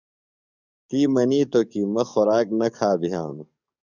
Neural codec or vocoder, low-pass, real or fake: codec, 44.1 kHz, 7.8 kbps, DAC; 7.2 kHz; fake